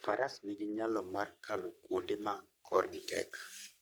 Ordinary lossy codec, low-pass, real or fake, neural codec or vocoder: none; none; fake; codec, 44.1 kHz, 3.4 kbps, Pupu-Codec